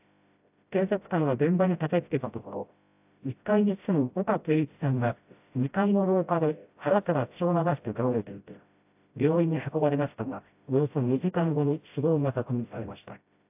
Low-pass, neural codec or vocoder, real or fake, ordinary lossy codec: 3.6 kHz; codec, 16 kHz, 0.5 kbps, FreqCodec, smaller model; fake; AAC, 32 kbps